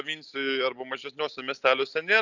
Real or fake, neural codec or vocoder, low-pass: real; none; 7.2 kHz